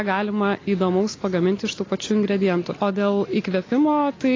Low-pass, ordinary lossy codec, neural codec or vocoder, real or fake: 7.2 kHz; AAC, 32 kbps; none; real